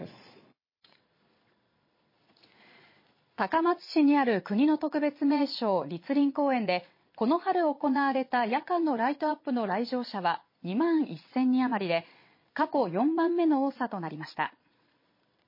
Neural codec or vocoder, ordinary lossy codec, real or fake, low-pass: vocoder, 22.05 kHz, 80 mel bands, Vocos; MP3, 24 kbps; fake; 5.4 kHz